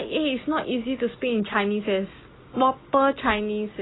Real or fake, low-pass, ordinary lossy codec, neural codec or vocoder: real; 7.2 kHz; AAC, 16 kbps; none